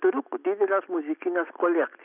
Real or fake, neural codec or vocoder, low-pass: real; none; 3.6 kHz